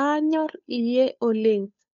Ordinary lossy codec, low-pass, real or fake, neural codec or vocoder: none; 7.2 kHz; fake; codec, 16 kHz, 2 kbps, FunCodec, trained on Chinese and English, 25 frames a second